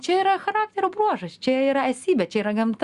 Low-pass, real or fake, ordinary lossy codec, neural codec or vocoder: 10.8 kHz; real; Opus, 64 kbps; none